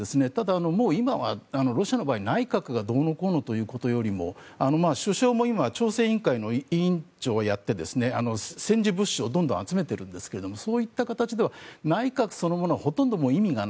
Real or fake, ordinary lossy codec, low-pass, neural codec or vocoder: real; none; none; none